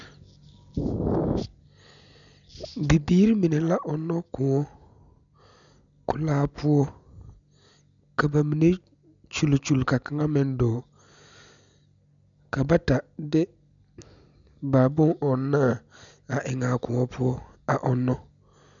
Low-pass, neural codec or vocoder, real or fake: 7.2 kHz; none; real